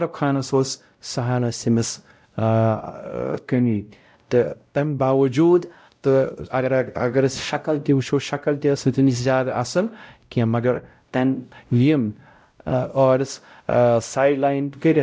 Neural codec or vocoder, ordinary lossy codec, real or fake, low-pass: codec, 16 kHz, 0.5 kbps, X-Codec, WavLM features, trained on Multilingual LibriSpeech; none; fake; none